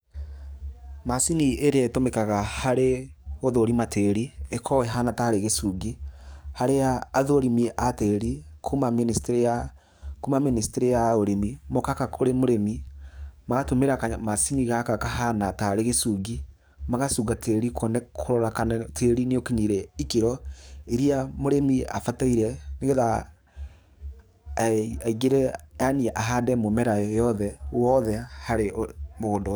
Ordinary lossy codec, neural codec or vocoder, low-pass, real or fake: none; codec, 44.1 kHz, 7.8 kbps, DAC; none; fake